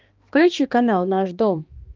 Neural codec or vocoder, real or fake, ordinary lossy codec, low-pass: codec, 16 kHz, 2 kbps, X-Codec, HuBERT features, trained on balanced general audio; fake; Opus, 32 kbps; 7.2 kHz